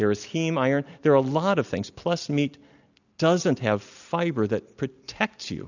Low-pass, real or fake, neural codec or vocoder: 7.2 kHz; real; none